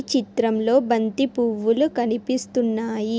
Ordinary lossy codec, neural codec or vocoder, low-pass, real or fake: none; none; none; real